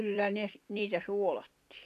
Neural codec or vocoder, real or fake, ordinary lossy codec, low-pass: vocoder, 44.1 kHz, 128 mel bands every 256 samples, BigVGAN v2; fake; none; 14.4 kHz